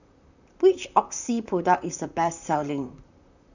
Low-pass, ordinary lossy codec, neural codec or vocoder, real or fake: 7.2 kHz; none; vocoder, 44.1 kHz, 128 mel bands, Pupu-Vocoder; fake